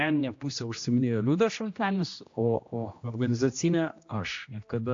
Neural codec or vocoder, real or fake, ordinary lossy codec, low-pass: codec, 16 kHz, 1 kbps, X-Codec, HuBERT features, trained on general audio; fake; AAC, 48 kbps; 7.2 kHz